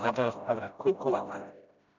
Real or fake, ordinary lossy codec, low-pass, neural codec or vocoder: fake; none; 7.2 kHz; codec, 16 kHz, 0.5 kbps, FreqCodec, smaller model